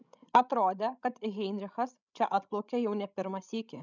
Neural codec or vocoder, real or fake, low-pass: codec, 16 kHz, 16 kbps, FreqCodec, larger model; fake; 7.2 kHz